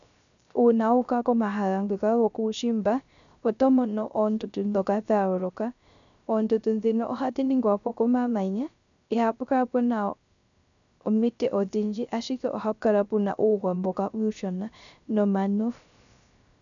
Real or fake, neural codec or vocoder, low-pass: fake; codec, 16 kHz, 0.3 kbps, FocalCodec; 7.2 kHz